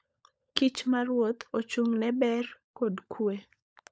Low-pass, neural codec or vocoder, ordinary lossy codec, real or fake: none; codec, 16 kHz, 16 kbps, FunCodec, trained on LibriTTS, 50 frames a second; none; fake